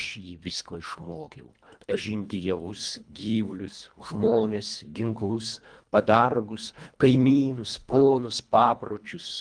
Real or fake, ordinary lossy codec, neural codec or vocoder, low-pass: fake; Opus, 32 kbps; codec, 24 kHz, 1.5 kbps, HILCodec; 9.9 kHz